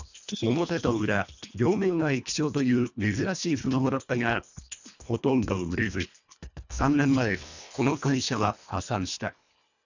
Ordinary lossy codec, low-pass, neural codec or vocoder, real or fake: none; 7.2 kHz; codec, 24 kHz, 1.5 kbps, HILCodec; fake